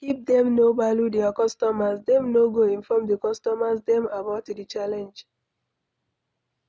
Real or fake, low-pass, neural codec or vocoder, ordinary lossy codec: real; none; none; none